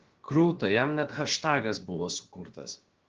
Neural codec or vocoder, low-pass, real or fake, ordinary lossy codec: codec, 16 kHz, about 1 kbps, DyCAST, with the encoder's durations; 7.2 kHz; fake; Opus, 16 kbps